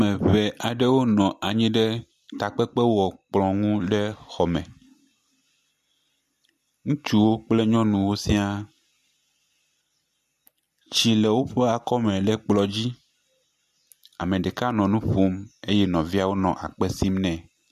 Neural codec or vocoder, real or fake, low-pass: none; real; 14.4 kHz